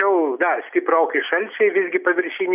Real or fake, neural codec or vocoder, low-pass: real; none; 3.6 kHz